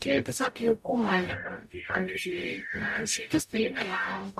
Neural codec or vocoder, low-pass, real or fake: codec, 44.1 kHz, 0.9 kbps, DAC; 14.4 kHz; fake